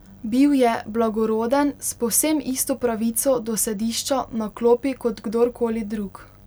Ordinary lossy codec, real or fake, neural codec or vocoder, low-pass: none; real; none; none